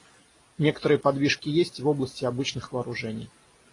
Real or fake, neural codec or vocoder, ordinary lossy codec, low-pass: real; none; AAC, 32 kbps; 10.8 kHz